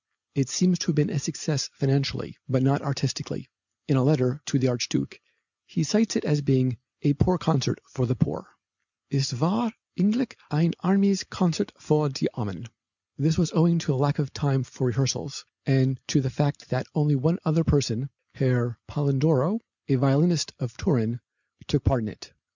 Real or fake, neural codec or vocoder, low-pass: real; none; 7.2 kHz